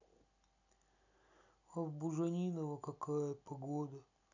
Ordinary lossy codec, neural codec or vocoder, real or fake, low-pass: none; none; real; 7.2 kHz